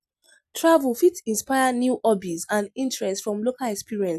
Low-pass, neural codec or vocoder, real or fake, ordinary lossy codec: 14.4 kHz; none; real; none